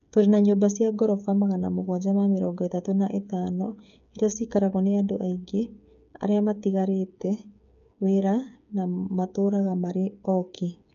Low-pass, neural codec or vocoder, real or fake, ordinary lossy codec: 7.2 kHz; codec, 16 kHz, 8 kbps, FreqCodec, smaller model; fake; none